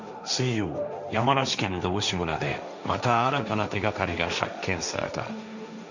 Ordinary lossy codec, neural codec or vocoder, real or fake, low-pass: none; codec, 16 kHz, 1.1 kbps, Voila-Tokenizer; fake; 7.2 kHz